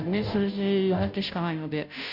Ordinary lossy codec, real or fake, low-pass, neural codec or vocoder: none; fake; 5.4 kHz; codec, 16 kHz, 0.5 kbps, FunCodec, trained on Chinese and English, 25 frames a second